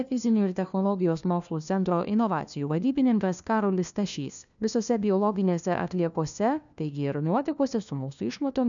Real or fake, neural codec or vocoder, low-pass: fake; codec, 16 kHz, 1 kbps, FunCodec, trained on LibriTTS, 50 frames a second; 7.2 kHz